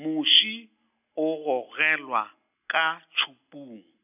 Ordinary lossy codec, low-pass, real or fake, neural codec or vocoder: none; 3.6 kHz; real; none